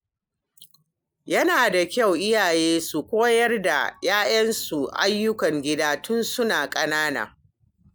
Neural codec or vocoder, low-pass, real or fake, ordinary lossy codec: none; none; real; none